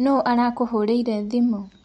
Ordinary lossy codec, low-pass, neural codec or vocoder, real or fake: MP3, 48 kbps; 19.8 kHz; none; real